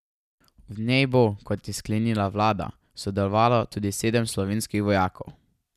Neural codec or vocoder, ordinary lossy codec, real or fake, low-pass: none; none; real; 14.4 kHz